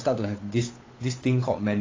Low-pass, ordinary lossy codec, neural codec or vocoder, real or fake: 7.2 kHz; AAC, 32 kbps; codec, 16 kHz, 8 kbps, FunCodec, trained on Chinese and English, 25 frames a second; fake